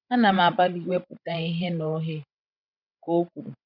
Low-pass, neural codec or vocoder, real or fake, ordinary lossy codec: 5.4 kHz; codec, 16 kHz, 16 kbps, FreqCodec, larger model; fake; none